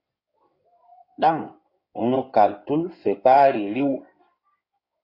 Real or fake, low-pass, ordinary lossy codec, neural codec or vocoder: fake; 5.4 kHz; AAC, 32 kbps; codec, 16 kHz in and 24 kHz out, 2.2 kbps, FireRedTTS-2 codec